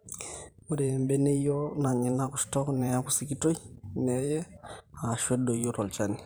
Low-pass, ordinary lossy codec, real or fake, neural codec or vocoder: none; none; fake; vocoder, 44.1 kHz, 128 mel bands every 512 samples, BigVGAN v2